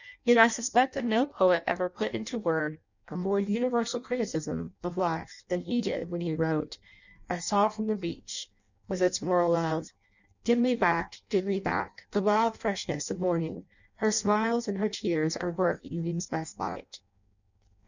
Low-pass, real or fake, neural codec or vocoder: 7.2 kHz; fake; codec, 16 kHz in and 24 kHz out, 0.6 kbps, FireRedTTS-2 codec